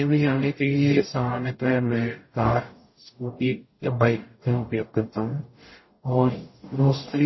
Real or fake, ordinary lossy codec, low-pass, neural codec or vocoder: fake; MP3, 24 kbps; 7.2 kHz; codec, 44.1 kHz, 0.9 kbps, DAC